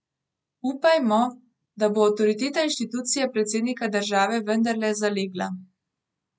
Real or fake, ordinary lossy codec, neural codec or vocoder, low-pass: real; none; none; none